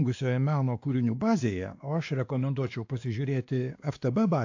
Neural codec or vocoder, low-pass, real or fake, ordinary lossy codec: codec, 16 kHz, 2 kbps, X-Codec, WavLM features, trained on Multilingual LibriSpeech; 7.2 kHz; fake; AAC, 48 kbps